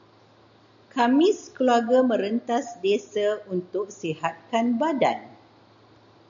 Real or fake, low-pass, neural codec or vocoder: real; 7.2 kHz; none